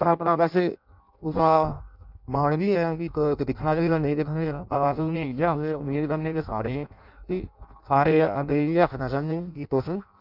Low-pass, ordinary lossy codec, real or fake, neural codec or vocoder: 5.4 kHz; none; fake; codec, 16 kHz in and 24 kHz out, 0.6 kbps, FireRedTTS-2 codec